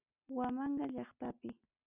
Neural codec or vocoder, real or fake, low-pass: none; real; 3.6 kHz